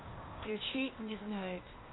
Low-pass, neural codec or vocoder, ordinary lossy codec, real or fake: 7.2 kHz; codec, 16 kHz, 0.8 kbps, ZipCodec; AAC, 16 kbps; fake